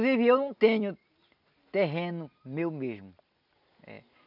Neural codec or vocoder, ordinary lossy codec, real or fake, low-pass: none; none; real; 5.4 kHz